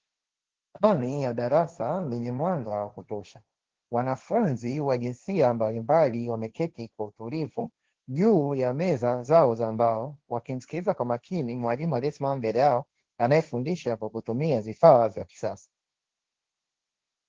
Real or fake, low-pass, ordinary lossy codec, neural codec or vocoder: fake; 7.2 kHz; Opus, 16 kbps; codec, 16 kHz, 1.1 kbps, Voila-Tokenizer